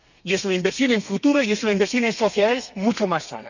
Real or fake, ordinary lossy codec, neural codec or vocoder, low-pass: fake; none; codec, 32 kHz, 1.9 kbps, SNAC; 7.2 kHz